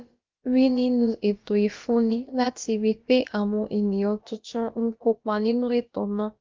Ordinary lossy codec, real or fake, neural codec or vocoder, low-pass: Opus, 32 kbps; fake; codec, 16 kHz, about 1 kbps, DyCAST, with the encoder's durations; 7.2 kHz